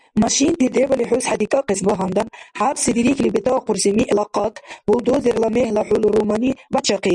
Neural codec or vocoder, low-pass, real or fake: none; 10.8 kHz; real